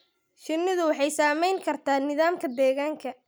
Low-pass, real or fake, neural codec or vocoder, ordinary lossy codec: none; real; none; none